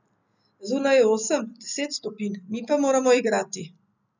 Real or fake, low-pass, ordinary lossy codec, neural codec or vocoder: real; 7.2 kHz; none; none